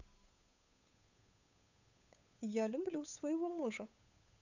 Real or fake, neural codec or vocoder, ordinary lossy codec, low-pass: fake; codec, 16 kHz, 8 kbps, FunCodec, trained on Chinese and English, 25 frames a second; none; 7.2 kHz